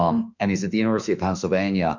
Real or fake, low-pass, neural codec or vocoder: fake; 7.2 kHz; autoencoder, 48 kHz, 32 numbers a frame, DAC-VAE, trained on Japanese speech